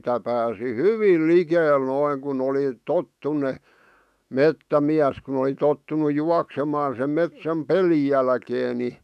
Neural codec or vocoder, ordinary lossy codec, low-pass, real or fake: autoencoder, 48 kHz, 128 numbers a frame, DAC-VAE, trained on Japanese speech; none; 14.4 kHz; fake